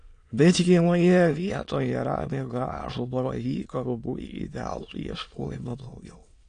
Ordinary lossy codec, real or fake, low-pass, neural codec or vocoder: AAC, 48 kbps; fake; 9.9 kHz; autoencoder, 22.05 kHz, a latent of 192 numbers a frame, VITS, trained on many speakers